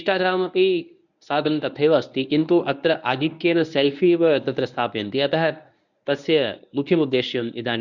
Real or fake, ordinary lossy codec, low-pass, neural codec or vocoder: fake; none; 7.2 kHz; codec, 24 kHz, 0.9 kbps, WavTokenizer, medium speech release version 1